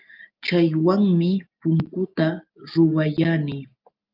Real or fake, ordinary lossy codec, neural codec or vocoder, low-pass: real; Opus, 24 kbps; none; 5.4 kHz